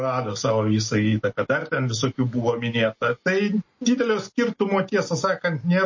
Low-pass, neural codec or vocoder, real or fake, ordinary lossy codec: 7.2 kHz; none; real; MP3, 32 kbps